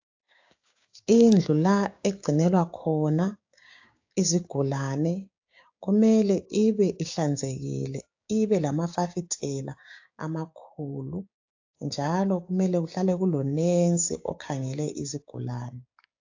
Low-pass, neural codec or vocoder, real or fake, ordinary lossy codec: 7.2 kHz; codec, 16 kHz, 6 kbps, DAC; fake; AAC, 48 kbps